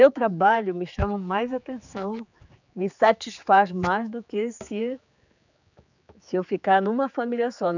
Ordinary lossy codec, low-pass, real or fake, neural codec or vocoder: none; 7.2 kHz; fake; codec, 16 kHz, 4 kbps, X-Codec, HuBERT features, trained on general audio